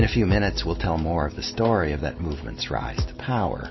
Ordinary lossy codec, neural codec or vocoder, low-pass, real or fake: MP3, 24 kbps; none; 7.2 kHz; real